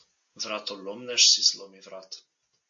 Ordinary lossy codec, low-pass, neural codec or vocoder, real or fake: AAC, 48 kbps; 7.2 kHz; none; real